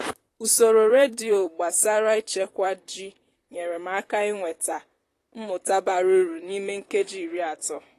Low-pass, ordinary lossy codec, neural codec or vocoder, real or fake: 14.4 kHz; AAC, 48 kbps; vocoder, 44.1 kHz, 128 mel bands, Pupu-Vocoder; fake